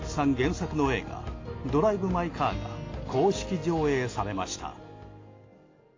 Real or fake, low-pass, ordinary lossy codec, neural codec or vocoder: real; 7.2 kHz; AAC, 32 kbps; none